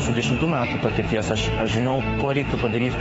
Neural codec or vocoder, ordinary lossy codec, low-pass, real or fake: autoencoder, 48 kHz, 32 numbers a frame, DAC-VAE, trained on Japanese speech; AAC, 24 kbps; 19.8 kHz; fake